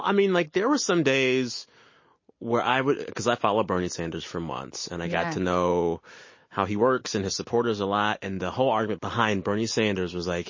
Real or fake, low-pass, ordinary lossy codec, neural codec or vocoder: real; 7.2 kHz; MP3, 32 kbps; none